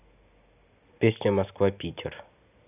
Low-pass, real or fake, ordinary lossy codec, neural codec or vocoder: 3.6 kHz; real; none; none